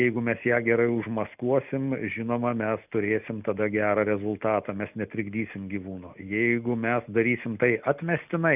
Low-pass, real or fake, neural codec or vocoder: 3.6 kHz; real; none